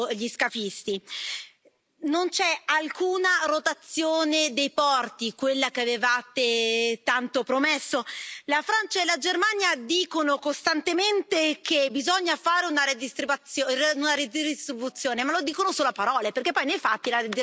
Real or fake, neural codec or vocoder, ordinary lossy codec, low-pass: real; none; none; none